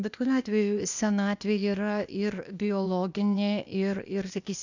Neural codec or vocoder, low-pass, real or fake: codec, 16 kHz, 0.8 kbps, ZipCodec; 7.2 kHz; fake